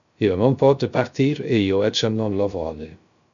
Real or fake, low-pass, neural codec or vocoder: fake; 7.2 kHz; codec, 16 kHz, 0.3 kbps, FocalCodec